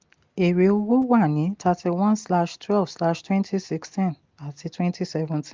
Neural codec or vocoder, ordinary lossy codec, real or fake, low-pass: none; Opus, 32 kbps; real; 7.2 kHz